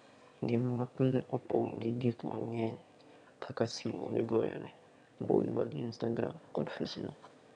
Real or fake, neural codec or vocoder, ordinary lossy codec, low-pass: fake; autoencoder, 22.05 kHz, a latent of 192 numbers a frame, VITS, trained on one speaker; none; 9.9 kHz